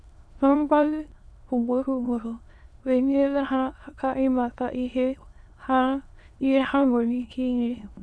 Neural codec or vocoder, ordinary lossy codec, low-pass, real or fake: autoencoder, 22.05 kHz, a latent of 192 numbers a frame, VITS, trained on many speakers; none; none; fake